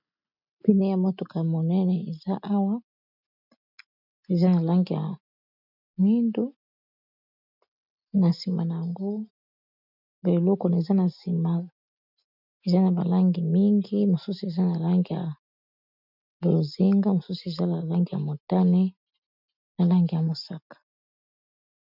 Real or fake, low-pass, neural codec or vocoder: real; 5.4 kHz; none